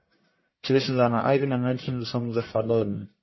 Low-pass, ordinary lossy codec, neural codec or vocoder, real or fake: 7.2 kHz; MP3, 24 kbps; codec, 44.1 kHz, 1.7 kbps, Pupu-Codec; fake